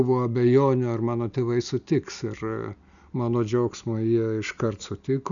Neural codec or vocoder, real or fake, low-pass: none; real; 7.2 kHz